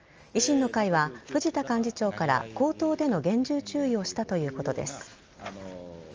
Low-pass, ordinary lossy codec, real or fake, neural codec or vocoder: 7.2 kHz; Opus, 24 kbps; real; none